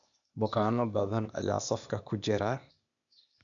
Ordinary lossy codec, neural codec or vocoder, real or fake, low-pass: none; codec, 16 kHz, 0.8 kbps, ZipCodec; fake; 7.2 kHz